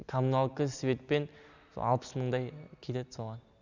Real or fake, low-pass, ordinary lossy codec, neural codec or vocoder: real; 7.2 kHz; none; none